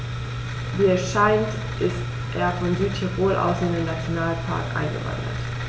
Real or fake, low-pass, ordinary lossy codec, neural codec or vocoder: real; none; none; none